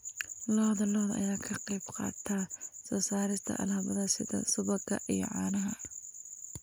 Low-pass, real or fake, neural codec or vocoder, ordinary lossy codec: none; real; none; none